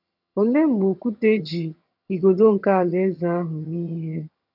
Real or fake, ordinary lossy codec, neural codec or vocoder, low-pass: fake; none; vocoder, 22.05 kHz, 80 mel bands, HiFi-GAN; 5.4 kHz